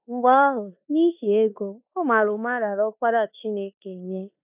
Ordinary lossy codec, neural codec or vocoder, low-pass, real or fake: none; codec, 16 kHz in and 24 kHz out, 0.9 kbps, LongCat-Audio-Codec, four codebook decoder; 3.6 kHz; fake